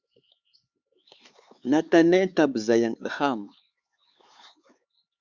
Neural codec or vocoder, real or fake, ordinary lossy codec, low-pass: codec, 16 kHz, 4 kbps, X-Codec, HuBERT features, trained on LibriSpeech; fake; Opus, 64 kbps; 7.2 kHz